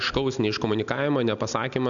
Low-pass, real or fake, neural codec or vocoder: 7.2 kHz; real; none